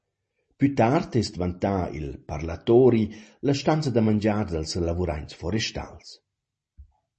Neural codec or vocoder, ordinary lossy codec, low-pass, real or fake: none; MP3, 32 kbps; 10.8 kHz; real